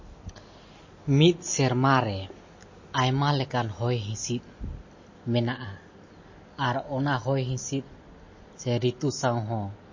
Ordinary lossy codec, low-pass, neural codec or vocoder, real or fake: MP3, 32 kbps; 7.2 kHz; none; real